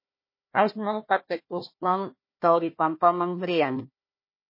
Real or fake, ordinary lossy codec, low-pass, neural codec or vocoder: fake; MP3, 24 kbps; 5.4 kHz; codec, 16 kHz, 1 kbps, FunCodec, trained on Chinese and English, 50 frames a second